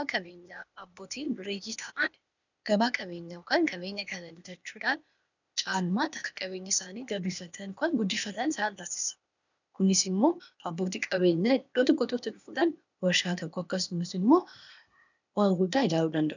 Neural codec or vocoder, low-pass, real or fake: codec, 16 kHz, 0.8 kbps, ZipCodec; 7.2 kHz; fake